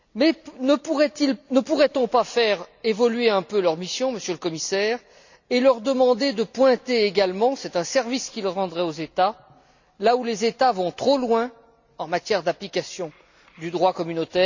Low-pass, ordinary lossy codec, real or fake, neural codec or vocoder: 7.2 kHz; none; real; none